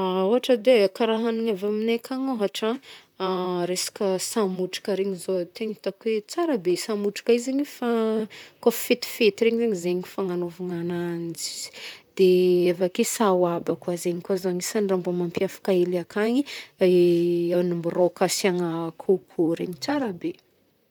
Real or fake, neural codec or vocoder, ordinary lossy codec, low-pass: fake; vocoder, 44.1 kHz, 128 mel bands, Pupu-Vocoder; none; none